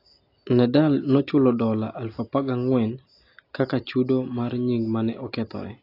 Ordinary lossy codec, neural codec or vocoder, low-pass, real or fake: AAC, 32 kbps; none; 5.4 kHz; real